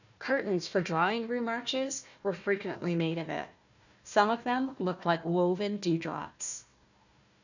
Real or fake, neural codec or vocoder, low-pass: fake; codec, 16 kHz, 1 kbps, FunCodec, trained on Chinese and English, 50 frames a second; 7.2 kHz